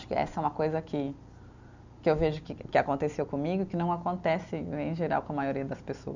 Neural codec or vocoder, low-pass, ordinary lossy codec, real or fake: none; 7.2 kHz; none; real